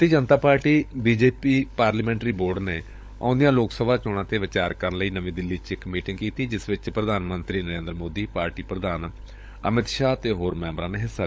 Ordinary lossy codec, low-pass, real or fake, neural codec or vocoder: none; none; fake; codec, 16 kHz, 16 kbps, FunCodec, trained on LibriTTS, 50 frames a second